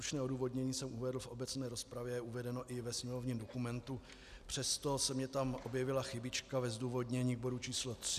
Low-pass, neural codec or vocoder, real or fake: 14.4 kHz; none; real